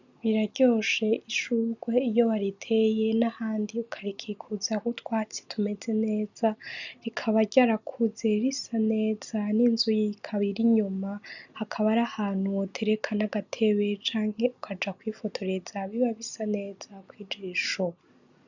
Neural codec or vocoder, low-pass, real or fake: none; 7.2 kHz; real